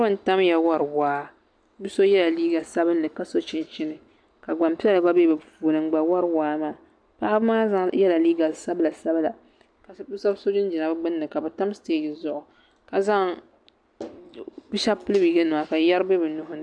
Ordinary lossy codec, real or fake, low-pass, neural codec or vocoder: AAC, 64 kbps; real; 9.9 kHz; none